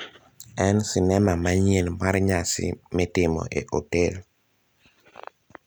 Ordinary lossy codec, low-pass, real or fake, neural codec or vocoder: none; none; real; none